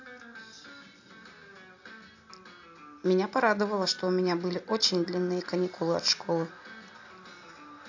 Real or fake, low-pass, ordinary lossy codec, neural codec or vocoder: real; 7.2 kHz; AAC, 48 kbps; none